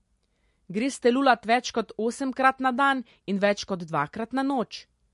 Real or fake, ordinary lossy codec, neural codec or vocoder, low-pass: real; MP3, 48 kbps; none; 10.8 kHz